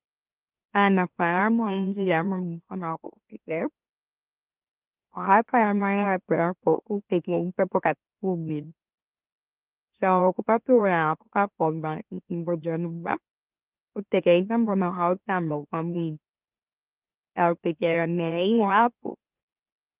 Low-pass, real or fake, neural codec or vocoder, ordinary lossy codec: 3.6 kHz; fake; autoencoder, 44.1 kHz, a latent of 192 numbers a frame, MeloTTS; Opus, 32 kbps